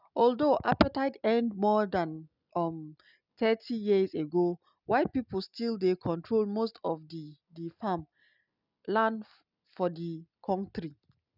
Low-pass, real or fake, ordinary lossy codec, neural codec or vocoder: 5.4 kHz; real; none; none